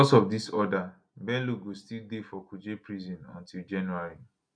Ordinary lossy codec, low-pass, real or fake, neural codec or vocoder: none; 9.9 kHz; real; none